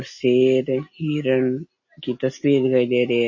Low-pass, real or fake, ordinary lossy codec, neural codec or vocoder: 7.2 kHz; real; MP3, 32 kbps; none